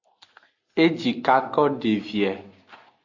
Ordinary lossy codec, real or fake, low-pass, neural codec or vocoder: AAC, 48 kbps; real; 7.2 kHz; none